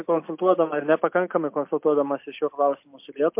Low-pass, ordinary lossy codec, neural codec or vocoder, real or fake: 3.6 kHz; MP3, 32 kbps; none; real